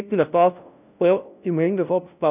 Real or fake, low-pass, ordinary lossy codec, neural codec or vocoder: fake; 3.6 kHz; none; codec, 16 kHz, 0.5 kbps, FunCodec, trained on LibriTTS, 25 frames a second